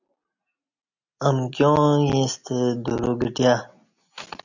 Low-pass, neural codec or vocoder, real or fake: 7.2 kHz; none; real